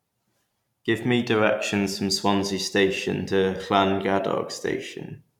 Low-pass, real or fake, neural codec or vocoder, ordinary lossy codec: 19.8 kHz; fake; vocoder, 44.1 kHz, 128 mel bands every 512 samples, BigVGAN v2; none